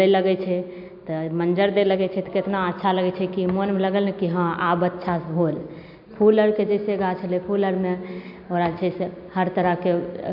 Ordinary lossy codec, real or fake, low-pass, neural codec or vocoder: none; real; 5.4 kHz; none